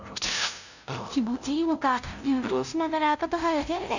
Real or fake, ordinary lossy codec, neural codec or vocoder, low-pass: fake; none; codec, 16 kHz, 0.5 kbps, FunCodec, trained on LibriTTS, 25 frames a second; 7.2 kHz